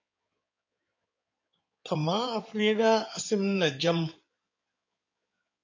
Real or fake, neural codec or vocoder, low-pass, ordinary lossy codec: fake; codec, 16 kHz in and 24 kHz out, 2.2 kbps, FireRedTTS-2 codec; 7.2 kHz; MP3, 48 kbps